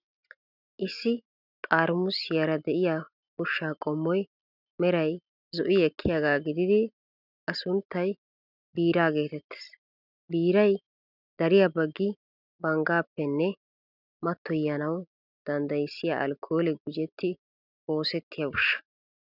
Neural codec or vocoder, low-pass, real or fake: none; 5.4 kHz; real